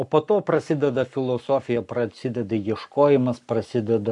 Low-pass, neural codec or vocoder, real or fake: 10.8 kHz; codec, 44.1 kHz, 7.8 kbps, Pupu-Codec; fake